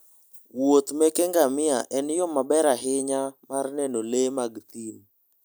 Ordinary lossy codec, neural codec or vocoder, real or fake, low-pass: none; none; real; none